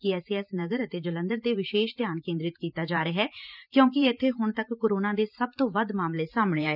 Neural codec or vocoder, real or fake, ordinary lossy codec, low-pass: vocoder, 44.1 kHz, 128 mel bands every 512 samples, BigVGAN v2; fake; none; 5.4 kHz